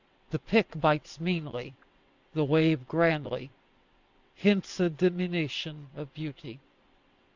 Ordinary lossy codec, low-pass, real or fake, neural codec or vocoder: Opus, 64 kbps; 7.2 kHz; fake; vocoder, 22.05 kHz, 80 mel bands, WaveNeXt